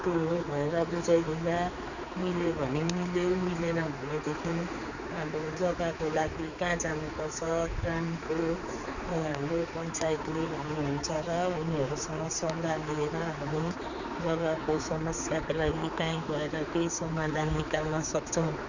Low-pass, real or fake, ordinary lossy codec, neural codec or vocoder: 7.2 kHz; fake; none; codec, 16 kHz, 4 kbps, X-Codec, HuBERT features, trained on general audio